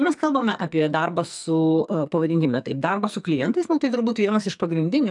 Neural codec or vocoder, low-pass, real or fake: codec, 44.1 kHz, 2.6 kbps, SNAC; 10.8 kHz; fake